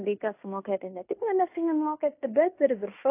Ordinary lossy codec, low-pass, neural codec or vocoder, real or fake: AAC, 24 kbps; 3.6 kHz; codec, 16 kHz in and 24 kHz out, 0.9 kbps, LongCat-Audio-Codec, fine tuned four codebook decoder; fake